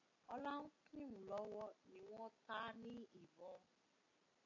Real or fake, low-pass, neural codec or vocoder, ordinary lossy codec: real; 7.2 kHz; none; AAC, 48 kbps